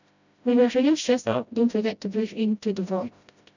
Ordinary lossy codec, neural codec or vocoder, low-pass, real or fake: none; codec, 16 kHz, 0.5 kbps, FreqCodec, smaller model; 7.2 kHz; fake